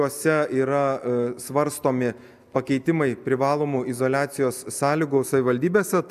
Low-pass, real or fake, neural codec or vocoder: 14.4 kHz; real; none